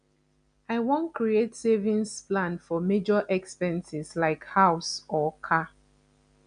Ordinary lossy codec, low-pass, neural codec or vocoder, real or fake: none; 9.9 kHz; none; real